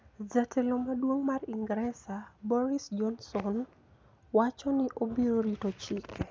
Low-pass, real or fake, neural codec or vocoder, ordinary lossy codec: none; real; none; none